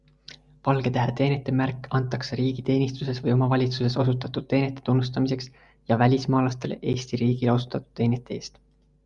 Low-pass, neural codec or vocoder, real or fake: 10.8 kHz; none; real